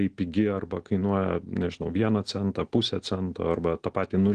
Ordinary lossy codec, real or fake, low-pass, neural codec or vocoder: Opus, 16 kbps; real; 9.9 kHz; none